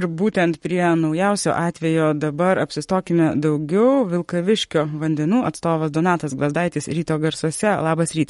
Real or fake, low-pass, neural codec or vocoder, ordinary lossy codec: fake; 19.8 kHz; codec, 44.1 kHz, 7.8 kbps, Pupu-Codec; MP3, 48 kbps